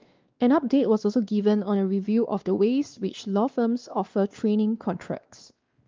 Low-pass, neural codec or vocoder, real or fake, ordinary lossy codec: 7.2 kHz; codec, 16 kHz, 2 kbps, X-Codec, WavLM features, trained on Multilingual LibriSpeech; fake; Opus, 24 kbps